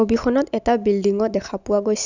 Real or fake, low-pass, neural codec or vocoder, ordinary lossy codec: real; 7.2 kHz; none; none